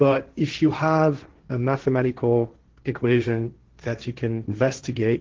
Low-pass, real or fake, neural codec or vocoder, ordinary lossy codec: 7.2 kHz; fake; codec, 16 kHz, 1.1 kbps, Voila-Tokenizer; Opus, 16 kbps